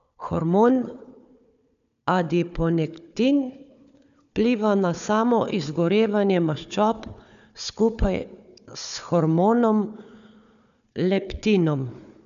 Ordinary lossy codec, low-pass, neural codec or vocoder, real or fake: none; 7.2 kHz; codec, 16 kHz, 4 kbps, FunCodec, trained on Chinese and English, 50 frames a second; fake